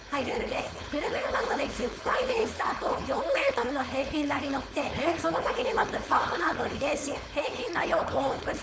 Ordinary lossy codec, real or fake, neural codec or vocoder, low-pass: none; fake; codec, 16 kHz, 4.8 kbps, FACodec; none